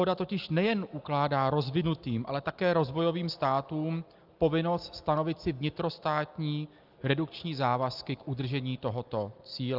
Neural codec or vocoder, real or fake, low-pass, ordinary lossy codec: none; real; 5.4 kHz; Opus, 24 kbps